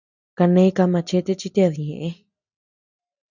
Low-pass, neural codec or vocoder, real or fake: 7.2 kHz; none; real